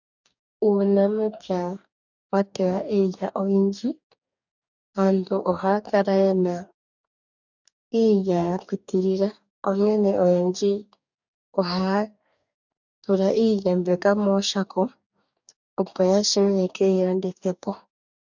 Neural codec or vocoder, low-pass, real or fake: codec, 44.1 kHz, 2.6 kbps, DAC; 7.2 kHz; fake